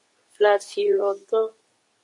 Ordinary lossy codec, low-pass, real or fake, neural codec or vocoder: MP3, 48 kbps; 10.8 kHz; fake; codec, 24 kHz, 0.9 kbps, WavTokenizer, medium speech release version 2